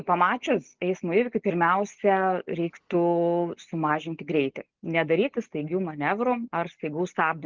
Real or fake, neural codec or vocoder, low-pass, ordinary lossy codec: real; none; 7.2 kHz; Opus, 24 kbps